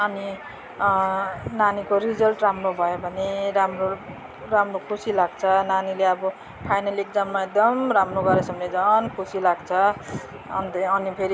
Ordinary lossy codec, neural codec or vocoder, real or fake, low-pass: none; none; real; none